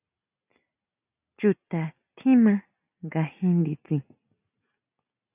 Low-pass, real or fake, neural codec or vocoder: 3.6 kHz; real; none